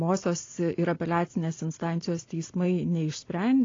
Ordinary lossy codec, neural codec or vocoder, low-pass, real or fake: AAC, 32 kbps; none; 7.2 kHz; real